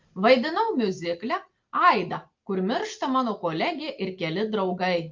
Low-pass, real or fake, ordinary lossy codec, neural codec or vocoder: 7.2 kHz; real; Opus, 32 kbps; none